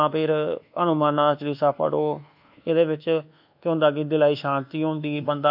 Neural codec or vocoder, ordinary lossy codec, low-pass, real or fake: codec, 24 kHz, 1.2 kbps, DualCodec; AAC, 48 kbps; 5.4 kHz; fake